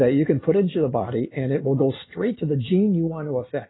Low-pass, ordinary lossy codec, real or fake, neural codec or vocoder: 7.2 kHz; AAC, 16 kbps; real; none